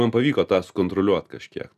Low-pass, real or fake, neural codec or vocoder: 14.4 kHz; real; none